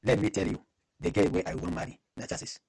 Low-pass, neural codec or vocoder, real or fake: 10.8 kHz; none; real